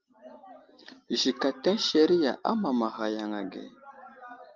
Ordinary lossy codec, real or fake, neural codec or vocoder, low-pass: Opus, 24 kbps; real; none; 7.2 kHz